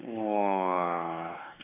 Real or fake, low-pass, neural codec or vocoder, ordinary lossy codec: fake; 3.6 kHz; codec, 16 kHz, 4 kbps, X-Codec, WavLM features, trained on Multilingual LibriSpeech; none